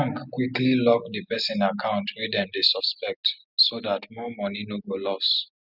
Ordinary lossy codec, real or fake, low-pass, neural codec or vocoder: none; real; 5.4 kHz; none